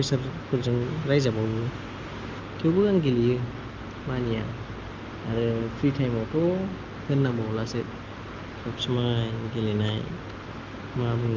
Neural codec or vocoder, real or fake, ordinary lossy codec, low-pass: none; real; Opus, 32 kbps; 7.2 kHz